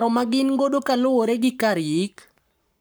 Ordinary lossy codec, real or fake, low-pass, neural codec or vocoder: none; fake; none; codec, 44.1 kHz, 7.8 kbps, Pupu-Codec